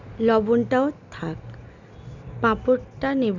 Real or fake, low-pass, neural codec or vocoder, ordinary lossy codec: real; 7.2 kHz; none; none